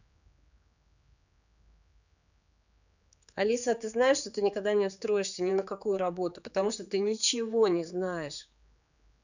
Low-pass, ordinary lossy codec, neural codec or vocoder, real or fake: 7.2 kHz; none; codec, 16 kHz, 4 kbps, X-Codec, HuBERT features, trained on general audio; fake